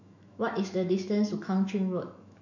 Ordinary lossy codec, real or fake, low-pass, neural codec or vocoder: none; fake; 7.2 kHz; autoencoder, 48 kHz, 128 numbers a frame, DAC-VAE, trained on Japanese speech